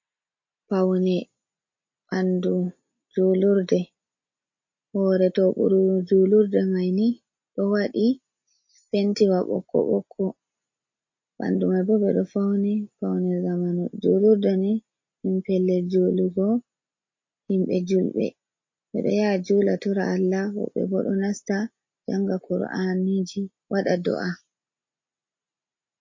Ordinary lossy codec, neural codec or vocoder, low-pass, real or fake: MP3, 32 kbps; none; 7.2 kHz; real